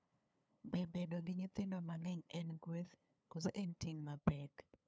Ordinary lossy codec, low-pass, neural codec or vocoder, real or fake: none; none; codec, 16 kHz, 2 kbps, FunCodec, trained on LibriTTS, 25 frames a second; fake